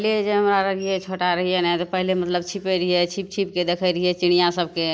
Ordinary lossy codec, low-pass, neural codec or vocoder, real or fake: none; none; none; real